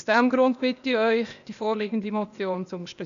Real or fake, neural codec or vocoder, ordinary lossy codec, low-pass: fake; codec, 16 kHz, 0.8 kbps, ZipCodec; none; 7.2 kHz